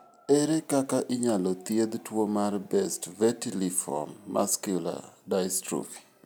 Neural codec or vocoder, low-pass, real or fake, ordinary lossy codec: none; none; real; none